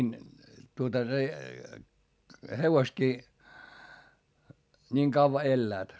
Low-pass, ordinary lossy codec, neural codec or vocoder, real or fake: none; none; none; real